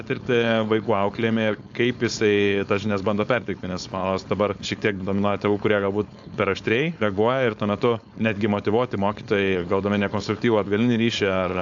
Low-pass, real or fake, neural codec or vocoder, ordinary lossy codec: 7.2 kHz; fake; codec, 16 kHz, 4.8 kbps, FACodec; AAC, 64 kbps